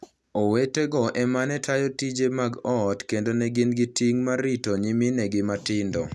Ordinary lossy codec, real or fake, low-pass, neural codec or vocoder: none; real; none; none